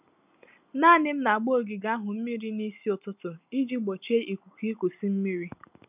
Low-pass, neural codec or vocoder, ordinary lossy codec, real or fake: 3.6 kHz; none; none; real